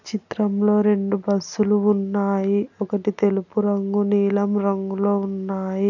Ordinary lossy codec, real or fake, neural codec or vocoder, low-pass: none; real; none; 7.2 kHz